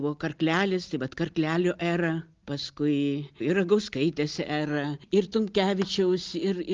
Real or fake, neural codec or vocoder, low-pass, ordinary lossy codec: real; none; 7.2 kHz; Opus, 32 kbps